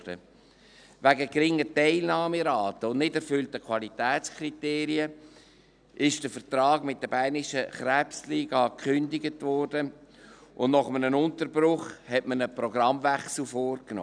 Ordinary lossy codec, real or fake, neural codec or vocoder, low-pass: none; real; none; 9.9 kHz